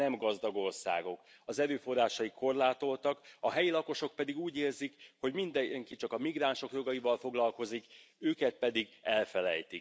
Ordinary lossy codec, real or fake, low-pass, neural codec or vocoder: none; real; none; none